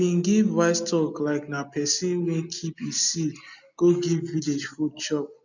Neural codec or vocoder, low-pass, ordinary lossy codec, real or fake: none; 7.2 kHz; none; real